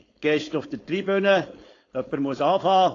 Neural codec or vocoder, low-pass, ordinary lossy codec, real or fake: codec, 16 kHz, 4.8 kbps, FACodec; 7.2 kHz; AAC, 32 kbps; fake